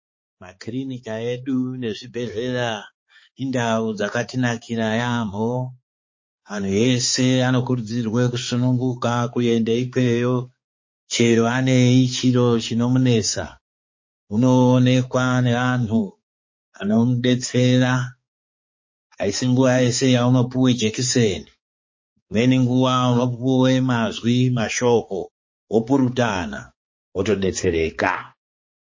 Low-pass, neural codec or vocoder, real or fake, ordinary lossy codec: 7.2 kHz; codec, 16 kHz, 4 kbps, X-Codec, HuBERT features, trained on balanced general audio; fake; MP3, 32 kbps